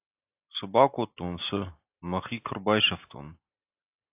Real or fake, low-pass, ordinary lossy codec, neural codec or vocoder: real; 3.6 kHz; AAC, 32 kbps; none